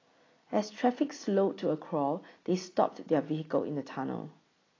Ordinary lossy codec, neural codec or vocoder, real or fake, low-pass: AAC, 32 kbps; none; real; 7.2 kHz